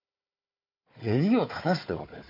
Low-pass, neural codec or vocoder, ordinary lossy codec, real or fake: 5.4 kHz; codec, 16 kHz, 4 kbps, FunCodec, trained on Chinese and English, 50 frames a second; MP3, 32 kbps; fake